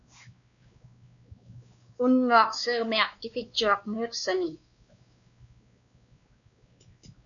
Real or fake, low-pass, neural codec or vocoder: fake; 7.2 kHz; codec, 16 kHz, 2 kbps, X-Codec, WavLM features, trained on Multilingual LibriSpeech